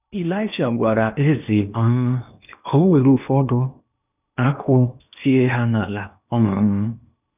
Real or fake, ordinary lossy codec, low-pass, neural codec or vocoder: fake; none; 3.6 kHz; codec, 16 kHz in and 24 kHz out, 0.8 kbps, FocalCodec, streaming, 65536 codes